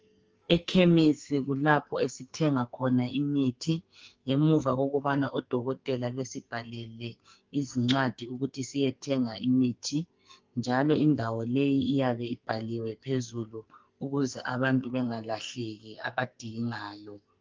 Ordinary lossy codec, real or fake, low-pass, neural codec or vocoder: Opus, 24 kbps; fake; 7.2 kHz; codec, 44.1 kHz, 2.6 kbps, SNAC